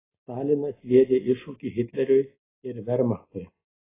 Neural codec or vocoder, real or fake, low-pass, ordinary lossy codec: none; real; 3.6 kHz; AAC, 16 kbps